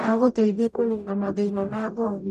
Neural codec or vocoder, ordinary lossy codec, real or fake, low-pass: codec, 44.1 kHz, 0.9 kbps, DAC; none; fake; 14.4 kHz